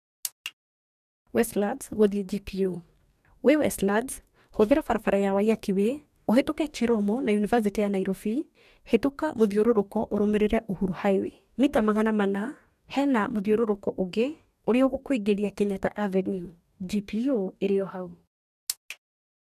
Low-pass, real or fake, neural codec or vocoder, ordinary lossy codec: 14.4 kHz; fake; codec, 44.1 kHz, 2.6 kbps, DAC; none